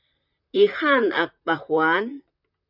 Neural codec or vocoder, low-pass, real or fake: vocoder, 44.1 kHz, 128 mel bands, Pupu-Vocoder; 5.4 kHz; fake